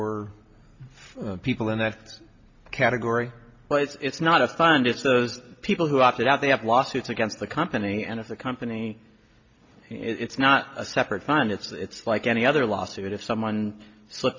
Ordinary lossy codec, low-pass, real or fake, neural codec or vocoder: MP3, 48 kbps; 7.2 kHz; real; none